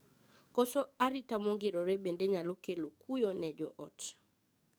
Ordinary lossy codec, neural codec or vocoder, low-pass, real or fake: none; codec, 44.1 kHz, 7.8 kbps, DAC; none; fake